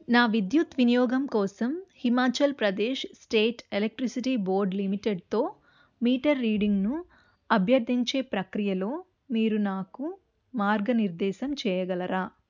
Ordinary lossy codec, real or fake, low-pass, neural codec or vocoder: none; real; 7.2 kHz; none